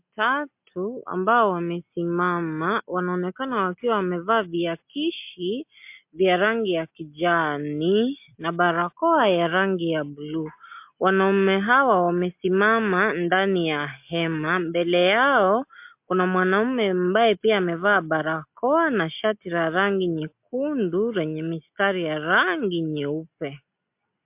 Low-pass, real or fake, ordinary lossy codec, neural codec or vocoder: 3.6 kHz; real; MP3, 32 kbps; none